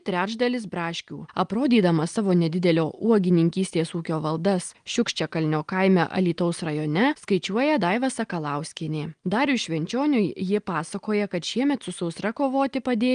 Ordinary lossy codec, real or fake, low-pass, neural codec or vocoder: Opus, 32 kbps; real; 9.9 kHz; none